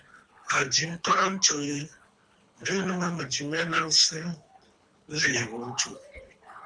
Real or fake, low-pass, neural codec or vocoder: fake; 9.9 kHz; codec, 24 kHz, 3 kbps, HILCodec